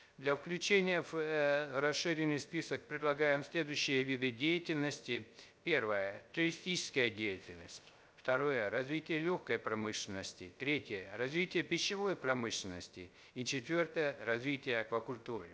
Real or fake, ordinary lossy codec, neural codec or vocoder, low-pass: fake; none; codec, 16 kHz, 0.3 kbps, FocalCodec; none